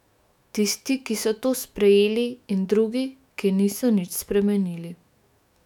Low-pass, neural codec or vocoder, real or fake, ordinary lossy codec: 19.8 kHz; autoencoder, 48 kHz, 128 numbers a frame, DAC-VAE, trained on Japanese speech; fake; none